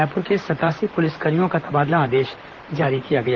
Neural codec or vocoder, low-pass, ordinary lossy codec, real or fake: vocoder, 44.1 kHz, 128 mel bands, Pupu-Vocoder; 7.2 kHz; Opus, 16 kbps; fake